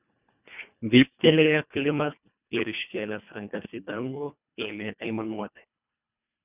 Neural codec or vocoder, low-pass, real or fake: codec, 24 kHz, 1.5 kbps, HILCodec; 3.6 kHz; fake